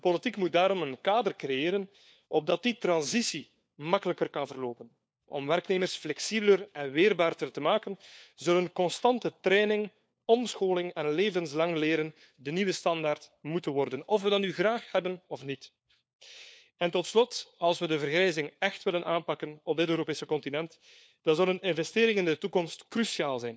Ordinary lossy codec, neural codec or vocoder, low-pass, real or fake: none; codec, 16 kHz, 4 kbps, FunCodec, trained on LibriTTS, 50 frames a second; none; fake